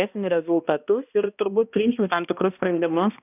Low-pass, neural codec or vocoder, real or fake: 3.6 kHz; codec, 16 kHz, 1 kbps, X-Codec, HuBERT features, trained on balanced general audio; fake